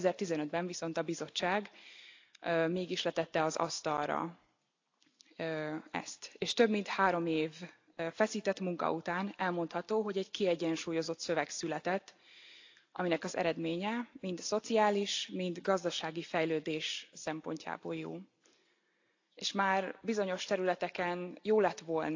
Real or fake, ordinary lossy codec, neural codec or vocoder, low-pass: real; AAC, 48 kbps; none; 7.2 kHz